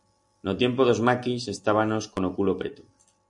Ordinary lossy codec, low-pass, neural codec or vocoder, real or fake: MP3, 96 kbps; 10.8 kHz; none; real